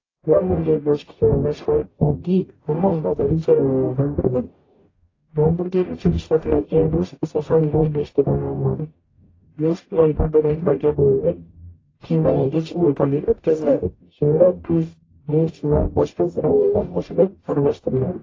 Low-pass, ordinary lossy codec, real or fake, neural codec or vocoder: 7.2 kHz; AAC, 32 kbps; fake; codec, 44.1 kHz, 0.9 kbps, DAC